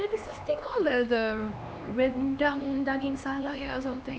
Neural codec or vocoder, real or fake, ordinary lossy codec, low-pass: codec, 16 kHz, 2 kbps, X-Codec, HuBERT features, trained on LibriSpeech; fake; none; none